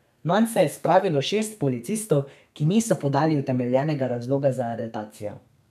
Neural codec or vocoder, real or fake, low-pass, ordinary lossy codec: codec, 32 kHz, 1.9 kbps, SNAC; fake; 14.4 kHz; none